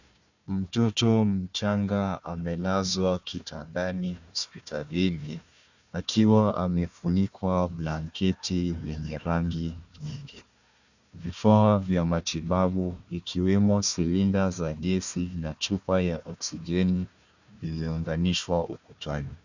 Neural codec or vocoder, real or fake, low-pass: codec, 16 kHz, 1 kbps, FunCodec, trained on Chinese and English, 50 frames a second; fake; 7.2 kHz